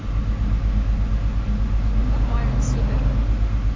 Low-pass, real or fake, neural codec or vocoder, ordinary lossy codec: 7.2 kHz; real; none; AAC, 32 kbps